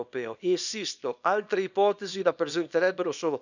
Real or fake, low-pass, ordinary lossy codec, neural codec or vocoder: fake; 7.2 kHz; none; codec, 24 kHz, 0.9 kbps, WavTokenizer, small release